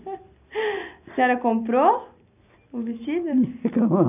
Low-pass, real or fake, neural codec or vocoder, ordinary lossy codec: 3.6 kHz; real; none; none